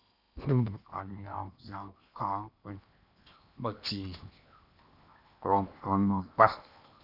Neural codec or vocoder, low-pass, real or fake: codec, 16 kHz in and 24 kHz out, 0.8 kbps, FocalCodec, streaming, 65536 codes; 5.4 kHz; fake